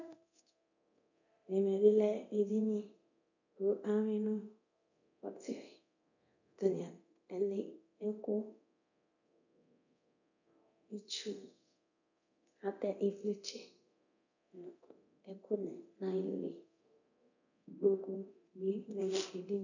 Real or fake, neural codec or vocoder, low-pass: fake; codec, 24 kHz, 0.9 kbps, DualCodec; 7.2 kHz